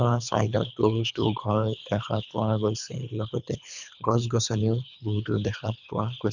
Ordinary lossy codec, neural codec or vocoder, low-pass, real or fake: none; codec, 24 kHz, 3 kbps, HILCodec; 7.2 kHz; fake